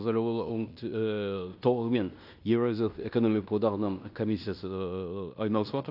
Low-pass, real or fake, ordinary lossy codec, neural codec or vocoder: 5.4 kHz; fake; AAC, 48 kbps; codec, 16 kHz in and 24 kHz out, 0.9 kbps, LongCat-Audio-Codec, fine tuned four codebook decoder